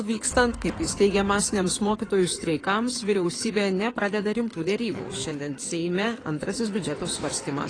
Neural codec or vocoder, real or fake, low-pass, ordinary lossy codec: codec, 16 kHz in and 24 kHz out, 2.2 kbps, FireRedTTS-2 codec; fake; 9.9 kHz; AAC, 32 kbps